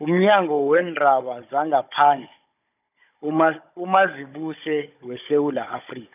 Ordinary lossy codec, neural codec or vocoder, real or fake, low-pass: none; codec, 16 kHz, 16 kbps, FunCodec, trained on Chinese and English, 50 frames a second; fake; 3.6 kHz